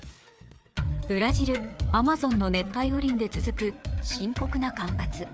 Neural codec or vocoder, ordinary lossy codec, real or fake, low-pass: codec, 16 kHz, 4 kbps, FreqCodec, larger model; none; fake; none